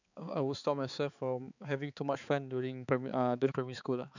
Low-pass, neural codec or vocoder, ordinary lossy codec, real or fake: 7.2 kHz; codec, 16 kHz, 4 kbps, X-Codec, HuBERT features, trained on balanced general audio; none; fake